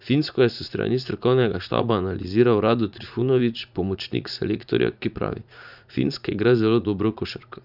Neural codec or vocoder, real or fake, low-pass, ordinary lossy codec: vocoder, 44.1 kHz, 80 mel bands, Vocos; fake; 5.4 kHz; none